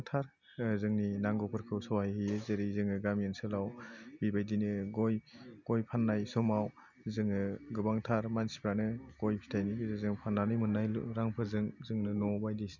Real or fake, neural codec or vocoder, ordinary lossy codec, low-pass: real; none; none; 7.2 kHz